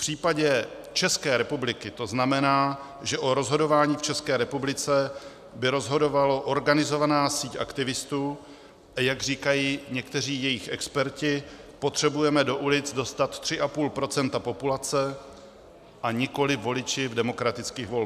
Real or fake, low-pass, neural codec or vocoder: real; 14.4 kHz; none